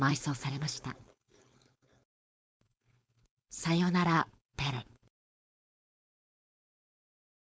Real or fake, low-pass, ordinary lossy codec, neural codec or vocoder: fake; none; none; codec, 16 kHz, 4.8 kbps, FACodec